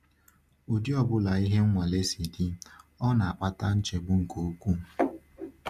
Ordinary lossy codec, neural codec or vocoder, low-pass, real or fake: Opus, 64 kbps; none; 14.4 kHz; real